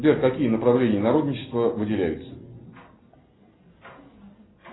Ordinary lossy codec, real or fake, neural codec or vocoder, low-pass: AAC, 16 kbps; real; none; 7.2 kHz